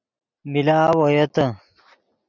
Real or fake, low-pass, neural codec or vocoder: real; 7.2 kHz; none